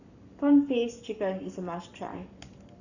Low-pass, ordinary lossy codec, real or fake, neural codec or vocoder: 7.2 kHz; none; fake; codec, 44.1 kHz, 7.8 kbps, Pupu-Codec